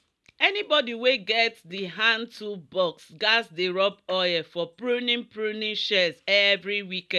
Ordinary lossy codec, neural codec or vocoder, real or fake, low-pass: none; none; real; none